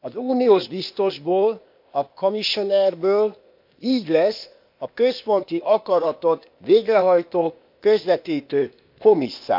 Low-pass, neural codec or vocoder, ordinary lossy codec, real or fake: 5.4 kHz; codec, 16 kHz, 0.8 kbps, ZipCodec; MP3, 48 kbps; fake